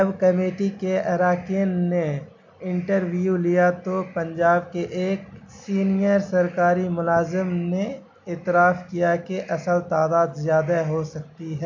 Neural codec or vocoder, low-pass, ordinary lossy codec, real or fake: none; 7.2 kHz; MP3, 64 kbps; real